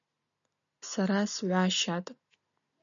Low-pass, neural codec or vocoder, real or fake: 7.2 kHz; none; real